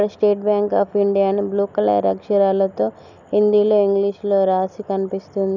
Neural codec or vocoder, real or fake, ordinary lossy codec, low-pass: none; real; none; 7.2 kHz